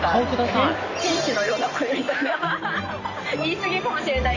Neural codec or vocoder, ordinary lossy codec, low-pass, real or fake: none; none; 7.2 kHz; real